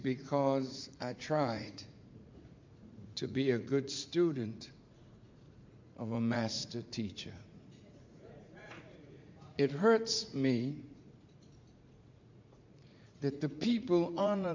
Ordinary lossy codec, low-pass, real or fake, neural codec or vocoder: MP3, 48 kbps; 7.2 kHz; fake; vocoder, 44.1 kHz, 80 mel bands, Vocos